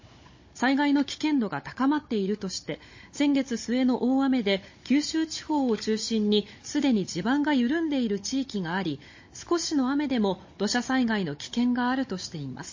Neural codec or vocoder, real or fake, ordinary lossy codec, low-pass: codec, 16 kHz, 16 kbps, FunCodec, trained on Chinese and English, 50 frames a second; fake; MP3, 32 kbps; 7.2 kHz